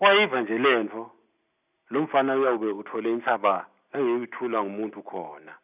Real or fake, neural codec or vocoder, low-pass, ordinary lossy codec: real; none; 3.6 kHz; none